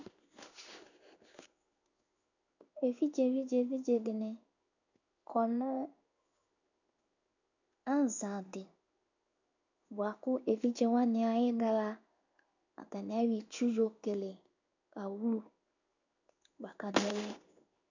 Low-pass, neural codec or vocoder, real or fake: 7.2 kHz; codec, 16 kHz in and 24 kHz out, 1 kbps, XY-Tokenizer; fake